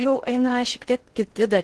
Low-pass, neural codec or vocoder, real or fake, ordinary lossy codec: 10.8 kHz; codec, 16 kHz in and 24 kHz out, 0.6 kbps, FocalCodec, streaming, 4096 codes; fake; Opus, 16 kbps